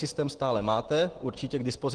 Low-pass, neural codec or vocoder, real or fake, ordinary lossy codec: 9.9 kHz; none; real; Opus, 16 kbps